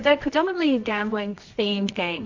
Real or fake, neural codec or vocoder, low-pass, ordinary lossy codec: fake; codec, 24 kHz, 0.9 kbps, WavTokenizer, medium music audio release; 7.2 kHz; MP3, 48 kbps